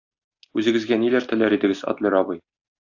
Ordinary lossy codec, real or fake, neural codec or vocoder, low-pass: AAC, 48 kbps; real; none; 7.2 kHz